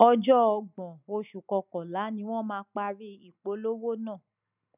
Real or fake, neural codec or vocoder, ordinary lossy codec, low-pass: real; none; none; 3.6 kHz